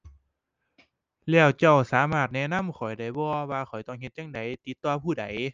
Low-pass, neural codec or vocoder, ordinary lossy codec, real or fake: 7.2 kHz; none; Opus, 24 kbps; real